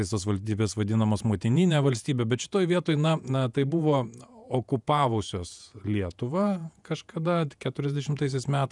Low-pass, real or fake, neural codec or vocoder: 10.8 kHz; fake; vocoder, 48 kHz, 128 mel bands, Vocos